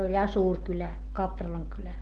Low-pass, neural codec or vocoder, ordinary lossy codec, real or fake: 9.9 kHz; none; Opus, 16 kbps; real